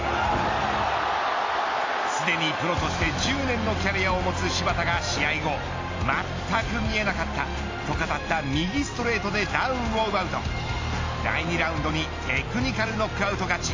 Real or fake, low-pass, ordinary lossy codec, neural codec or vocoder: real; 7.2 kHz; AAC, 32 kbps; none